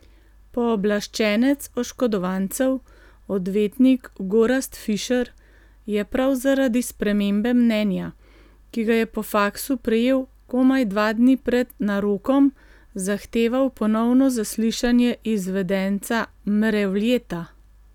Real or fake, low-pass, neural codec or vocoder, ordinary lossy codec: real; 19.8 kHz; none; none